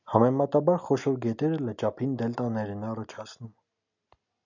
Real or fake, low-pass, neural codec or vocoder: real; 7.2 kHz; none